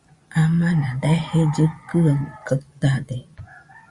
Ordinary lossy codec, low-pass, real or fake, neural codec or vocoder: Opus, 64 kbps; 10.8 kHz; fake; vocoder, 24 kHz, 100 mel bands, Vocos